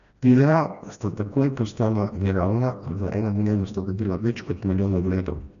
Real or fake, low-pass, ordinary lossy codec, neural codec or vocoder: fake; 7.2 kHz; none; codec, 16 kHz, 1 kbps, FreqCodec, smaller model